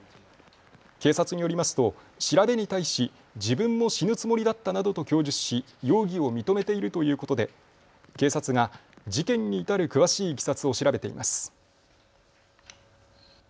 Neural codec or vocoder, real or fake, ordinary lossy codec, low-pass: none; real; none; none